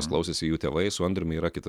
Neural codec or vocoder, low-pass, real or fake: none; 19.8 kHz; real